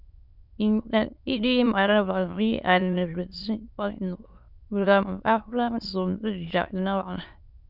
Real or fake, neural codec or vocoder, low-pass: fake; autoencoder, 22.05 kHz, a latent of 192 numbers a frame, VITS, trained on many speakers; 5.4 kHz